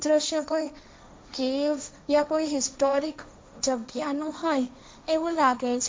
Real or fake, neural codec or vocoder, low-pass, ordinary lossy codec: fake; codec, 16 kHz, 1.1 kbps, Voila-Tokenizer; none; none